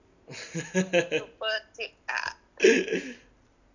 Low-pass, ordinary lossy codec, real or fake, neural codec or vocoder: 7.2 kHz; none; real; none